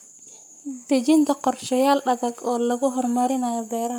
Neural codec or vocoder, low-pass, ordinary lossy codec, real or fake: codec, 44.1 kHz, 7.8 kbps, Pupu-Codec; none; none; fake